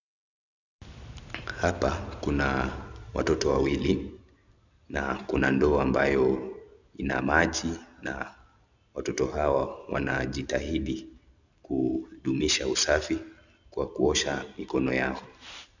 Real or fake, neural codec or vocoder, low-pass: real; none; 7.2 kHz